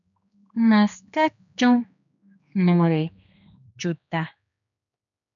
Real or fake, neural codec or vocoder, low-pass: fake; codec, 16 kHz, 2 kbps, X-Codec, HuBERT features, trained on general audio; 7.2 kHz